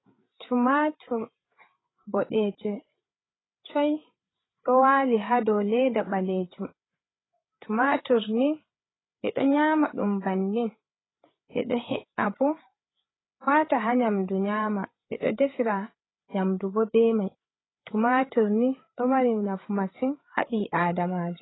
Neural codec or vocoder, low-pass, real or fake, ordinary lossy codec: codec, 16 kHz, 8 kbps, FreqCodec, larger model; 7.2 kHz; fake; AAC, 16 kbps